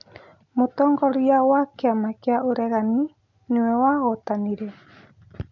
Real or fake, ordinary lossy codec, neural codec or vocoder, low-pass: real; none; none; 7.2 kHz